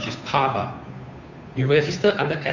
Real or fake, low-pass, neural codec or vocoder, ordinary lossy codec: fake; 7.2 kHz; codec, 16 kHz, 2 kbps, FunCodec, trained on Chinese and English, 25 frames a second; none